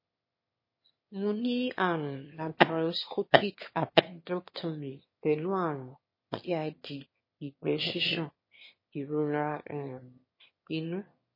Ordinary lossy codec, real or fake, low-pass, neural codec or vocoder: MP3, 24 kbps; fake; 5.4 kHz; autoencoder, 22.05 kHz, a latent of 192 numbers a frame, VITS, trained on one speaker